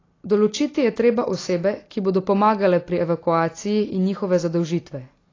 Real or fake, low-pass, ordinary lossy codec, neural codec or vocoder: real; 7.2 kHz; AAC, 32 kbps; none